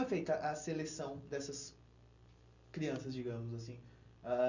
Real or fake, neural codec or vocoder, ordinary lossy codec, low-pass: real; none; none; 7.2 kHz